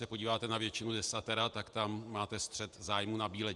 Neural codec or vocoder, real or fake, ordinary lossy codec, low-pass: vocoder, 48 kHz, 128 mel bands, Vocos; fake; Opus, 64 kbps; 10.8 kHz